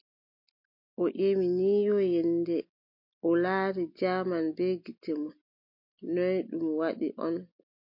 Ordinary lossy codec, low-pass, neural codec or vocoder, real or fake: MP3, 32 kbps; 5.4 kHz; none; real